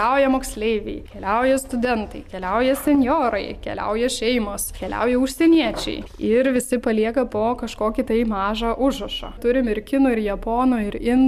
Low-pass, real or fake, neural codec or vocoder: 14.4 kHz; real; none